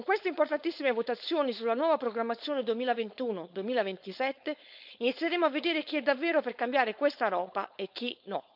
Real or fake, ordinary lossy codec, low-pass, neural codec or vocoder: fake; none; 5.4 kHz; codec, 16 kHz, 4.8 kbps, FACodec